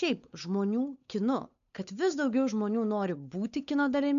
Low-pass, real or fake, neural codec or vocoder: 7.2 kHz; fake; codec, 16 kHz, 8 kbps, FunCodec, trained on Chinese and English, 25 frames a second